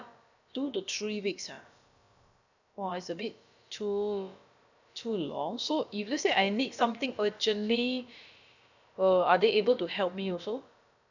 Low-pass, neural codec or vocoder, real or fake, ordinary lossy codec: 7.2 kHz; codec, 16 kHz, about 1 kbps, DyCAST, with the encoder's durations; fake; none